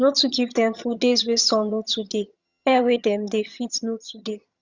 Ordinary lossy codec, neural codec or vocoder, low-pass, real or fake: Opus, 64 kbps; vocoder, 22.05 kHz, 80 mel bands, HiFi-GAN; 7.2 kHz; fake